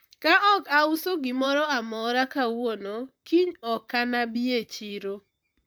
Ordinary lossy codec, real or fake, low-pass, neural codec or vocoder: none; fake; none; vocoder, 44.1 kHz, 128 mel bands, Pupu-Vocoder